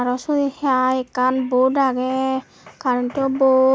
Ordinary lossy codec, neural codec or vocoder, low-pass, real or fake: none; none; none; real